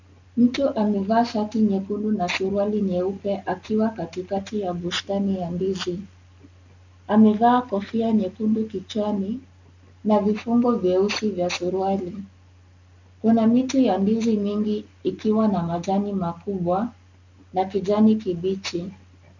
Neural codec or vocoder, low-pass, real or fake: none; 7.2 kHz; real